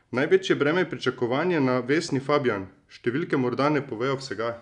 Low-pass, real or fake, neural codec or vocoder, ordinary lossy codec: 10.8 kHz; real; none; none